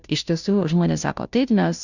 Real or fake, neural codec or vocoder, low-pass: fake; codec, 16 kHz, 0.5 kbps, FunCodec, trained on Chinese and English, 25 frames a second; 7.2 kHz